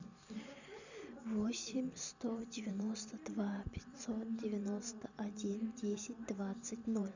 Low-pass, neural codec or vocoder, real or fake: 7.2 kHz; vocoder, 44.1 kHz, 128 mel bands every 512 samples, BigVGAN v2; fake